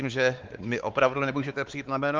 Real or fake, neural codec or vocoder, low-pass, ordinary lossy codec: fake; codec, 16 kHz, 4 kbps, X-Codec, WavLM features, trained on Multilingual LibriSpeech; 7.2 kHz; Opus, 32 kbps